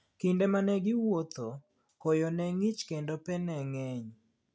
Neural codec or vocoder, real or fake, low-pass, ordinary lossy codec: none; real; none; none